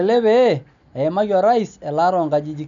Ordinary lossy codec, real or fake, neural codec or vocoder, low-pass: none; real; none; 7.2 kHz